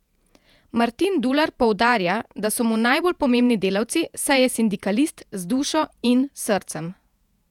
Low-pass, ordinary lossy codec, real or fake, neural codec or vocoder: 19.8 kHz; none; fake; vocoder, 48 kHz, 128 mel bands, Vocos